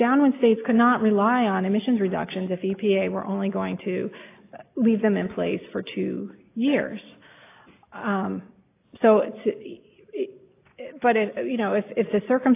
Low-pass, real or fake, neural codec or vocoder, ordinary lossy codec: 3.6 kHz; real; none; AAC, 24 kbps